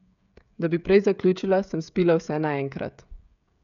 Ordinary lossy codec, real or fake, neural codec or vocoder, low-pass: none; fake; codec, 16 kHz, 16 kbps, FreqCodec, smaller model; 7.2 kHz